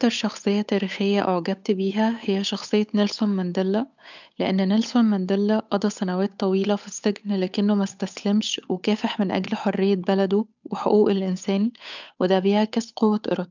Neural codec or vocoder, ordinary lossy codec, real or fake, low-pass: codec, 16 kHz, 8 kbps, FunCodec, trained on Chinese and English, 25 frames a second; none; fake; 7.2 kHz